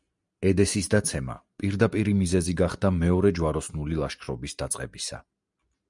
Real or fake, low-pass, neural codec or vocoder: real; 10.8 kHz; none